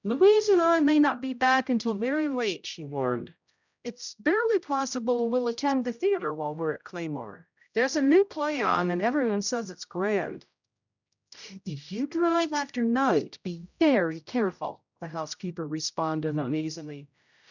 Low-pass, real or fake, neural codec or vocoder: 7.2 kHz; fake; codec, 16 kHz, 0.5 kbps, X-Codec, HuBERT features, trained on general audio